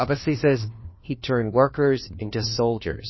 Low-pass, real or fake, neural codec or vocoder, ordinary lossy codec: 7.2 kHz; fake; codec, 16 kHz, 1 kbps, FunCodec, trained on LibriTTS, 50 frames a second; MP3, 24 kbps